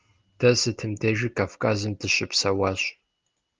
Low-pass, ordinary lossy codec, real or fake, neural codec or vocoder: 7.2 kHz; Opus, 32 kbps; real; none